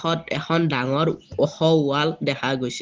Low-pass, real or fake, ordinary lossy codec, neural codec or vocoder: 7.2 kHz; real; Opus, 16 kbps; none